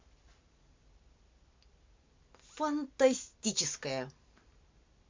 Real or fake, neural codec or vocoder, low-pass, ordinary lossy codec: real; none; 7.2 kHz; MP3, 64 kbps